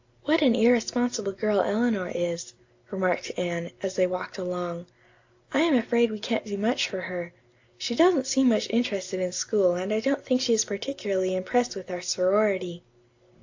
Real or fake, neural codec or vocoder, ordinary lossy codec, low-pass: real; none; AAC, 48 kbps; 7.2 kHz